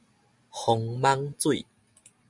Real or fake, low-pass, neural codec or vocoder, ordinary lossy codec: real; 10.8 kHz; none; MP3, 96 kbps